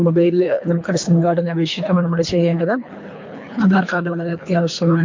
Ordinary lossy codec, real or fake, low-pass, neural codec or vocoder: MP3, 48 kbps; fake; 7.2 kHz; codec, 24 kHz, 3 kbps, HILCodec